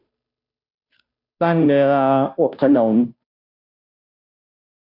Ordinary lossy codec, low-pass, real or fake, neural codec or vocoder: none; 5.4 kHz; fake; codec, 16 kHz, 0.5 kbps, FunCodec, trained on Chinese and English, 25 frames a second